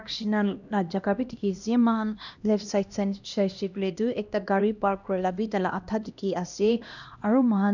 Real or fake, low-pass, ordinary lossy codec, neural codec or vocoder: fake; 7.2 kHz; none; codec, 16 kHz, 1 kbps, X-Codec, HuBERT features, trained on LibriSpeech